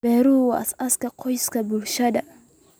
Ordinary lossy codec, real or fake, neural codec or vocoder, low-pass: none; real; none; none